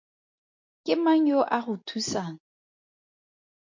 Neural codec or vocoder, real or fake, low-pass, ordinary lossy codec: none; real; 7.2 kHz; MP3, 48 kbps